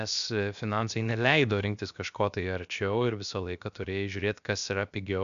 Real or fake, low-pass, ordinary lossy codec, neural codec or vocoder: fake; 7.2 kHz; MP3, 96 kbps; codec, 16 kHz, 0.7 kbps, FocalCodec